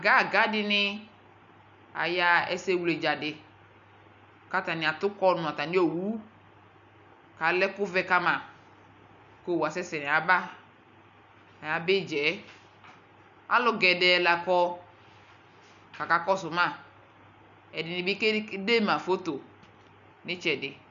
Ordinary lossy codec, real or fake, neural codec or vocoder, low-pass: MP3, 96 kbps; real; none; 7.2 kHz